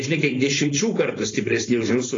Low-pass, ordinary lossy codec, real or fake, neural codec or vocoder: 7.2 kHz; AAC, 32 kbps; fake; codec, 16 kHz, 4.8 kbps, FACodec